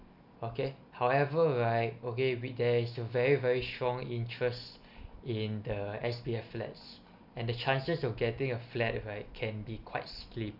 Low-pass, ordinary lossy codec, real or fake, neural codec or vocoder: 5.4 kHz; none; real; none